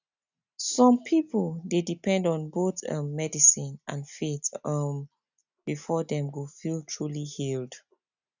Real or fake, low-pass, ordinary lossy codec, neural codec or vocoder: real; 7.2 kHz; none; none